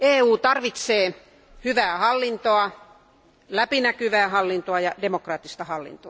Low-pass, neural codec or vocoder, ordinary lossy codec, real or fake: none; none; none; real